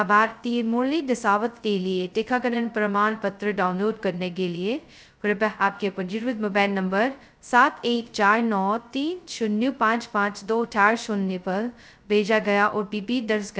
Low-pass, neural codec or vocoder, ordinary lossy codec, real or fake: none; codec, 16 kHz, 0.2 kbps, FocalCodec; none; fake